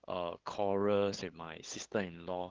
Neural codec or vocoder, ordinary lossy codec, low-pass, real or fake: codec, 16 kHz, 8 kbps, FunCodec, trained on LibriTTS, 25 frames a second; Opus, 16 kbps; 7.2 kHz; fake